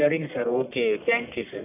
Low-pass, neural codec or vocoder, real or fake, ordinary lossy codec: 3.6 kHz; codec, 44.1 kHz, 1.7 kbps, Pupu-Codec; fake; none